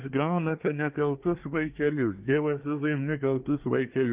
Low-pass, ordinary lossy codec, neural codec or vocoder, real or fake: 3.6 kHz; Opus, 32 kbps; codec, 24 kHz, 1 kbps, SNAC; fake